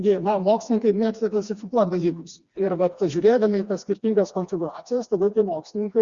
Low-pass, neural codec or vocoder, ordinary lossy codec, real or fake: 7.2 kHz; codec, 16 kHz, 2 kbps, FreqCodec, smaller model; Opus, 64 kbps; fake